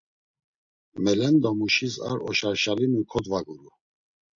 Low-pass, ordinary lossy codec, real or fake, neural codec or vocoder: 7.2 kHz; MP3, 64 kbps; real; none